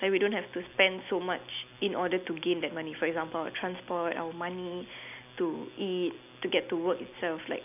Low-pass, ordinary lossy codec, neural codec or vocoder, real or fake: 3.6 kHz; none; none; real